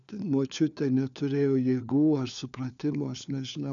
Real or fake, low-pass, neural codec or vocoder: fake; 7.2 kHz; codec, 16 kHz, 4 kbps, FunCodec, trained on LibriTTS, 50 frames a second